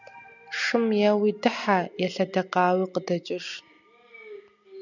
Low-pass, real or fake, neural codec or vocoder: 7.2 kHz; real; none